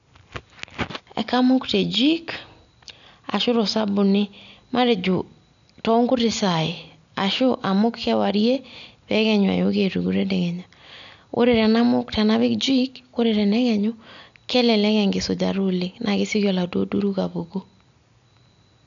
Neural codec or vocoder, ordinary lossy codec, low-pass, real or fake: none; none; 7.2 kHz; real